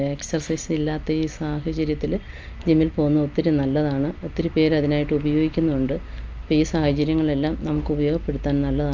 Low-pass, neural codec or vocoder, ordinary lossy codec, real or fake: 7.2 kHz; none; Opus, 24 kbps; real